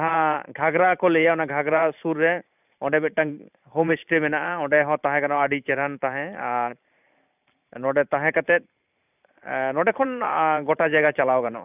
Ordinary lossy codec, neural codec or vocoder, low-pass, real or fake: none; vocoder, 44.1 kHz, 128 mel bands every 512 samples, BigVGAN v2; 3.6 kHz; fake